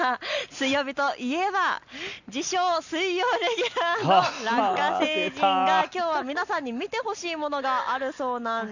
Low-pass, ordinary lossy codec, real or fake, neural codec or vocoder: 7.2 kHz; none; real; none